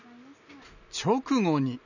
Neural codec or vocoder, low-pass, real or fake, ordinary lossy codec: none; 7.2 kHz; real; none